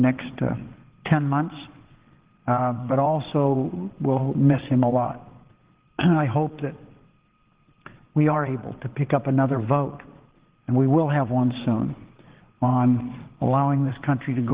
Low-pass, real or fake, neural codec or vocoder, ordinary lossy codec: 3.6 kHz; fake; vocoder, 22.05 kHz, 80 mel bands, WaveNeXt; Opus, 16 kbps